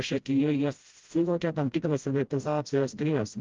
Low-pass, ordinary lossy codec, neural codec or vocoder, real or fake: 7.2 kHz; Opus, 32 kbps; codec, 16 kHz, 0.5 kbps, FreqCodec, smaller model; fake